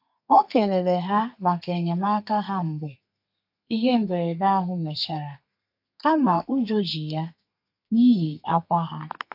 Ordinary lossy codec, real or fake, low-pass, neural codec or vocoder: AAC, 48 kbps; fake; 5.4 kHz; codec, 32 kHz, 1.9 kbps, SNAC